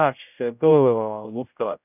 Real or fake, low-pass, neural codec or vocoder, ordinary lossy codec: fake; 3.6 kHz; codec, 16 kHz, 0.5 kbps, X-Codec, HuBERT features, trained on general audio; none